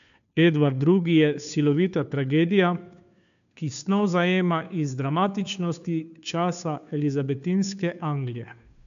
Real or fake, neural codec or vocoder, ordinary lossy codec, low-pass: fake; codec, 16 kHz, 2 kbps, FunCodec, trained on Chinese and English, 25 frames a second; none; 7.2 kHz